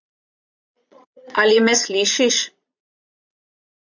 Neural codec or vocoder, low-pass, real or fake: vocoder, 22.05 kHz, 80 mel bands, Vocos; 7.2 kHz; fake